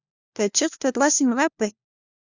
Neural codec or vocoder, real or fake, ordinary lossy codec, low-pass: codec, 16 kHz, 1 kbps, FunCodec, trained on LibriTTS, 50 frames a second; fake; Opus, 64 kbps; 7.2 kHz